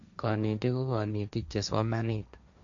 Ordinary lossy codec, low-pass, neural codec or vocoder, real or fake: none; 7.2 kHz; codec, 16 kHz, 1.1 kbps, Voila-Tokenizer; fake